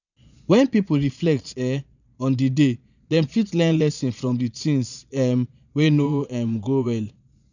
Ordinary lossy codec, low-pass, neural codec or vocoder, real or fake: none; 7.2 kHz; vocoder, 22.05 kHz, 80 mel bands, Vocos; fake